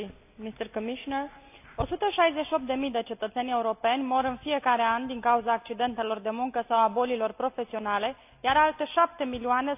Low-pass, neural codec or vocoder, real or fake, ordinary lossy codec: 3.6 kHz; none; real; AAC, 32 kbps